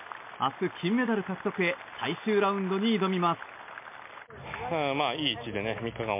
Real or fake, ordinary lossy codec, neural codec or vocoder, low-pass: real; MP3, 24 kbps; none; 3.6 kHz